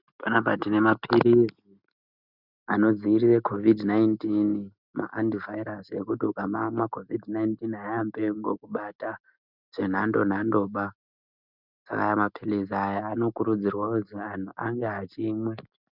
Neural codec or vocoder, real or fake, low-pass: none; real; 5.4 kHz